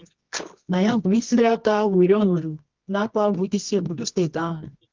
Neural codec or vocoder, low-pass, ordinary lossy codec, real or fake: codec, 24 kHz, 0.9 kbps, WavTokenizer, medium music audio release; 7.2 kHz; Opus, 24 kbps; fake